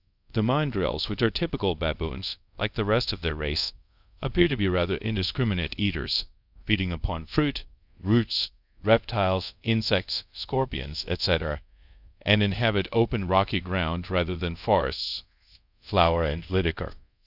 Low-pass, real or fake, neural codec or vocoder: 5.4 kHz; fake; codec, 24 kHz, 0.5 kbps, DualCodec